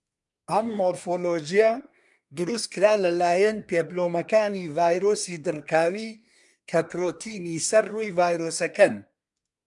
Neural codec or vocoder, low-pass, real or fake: codec, 24 kHz, 1 kbps, SNAC; 10.8 kHz; fake